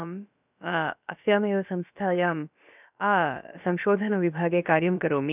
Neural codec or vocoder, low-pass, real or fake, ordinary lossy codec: codec, 16 kHz, about 1 kbps, DyCAST, with the encoder's durations; 3.6 kHz; fake; none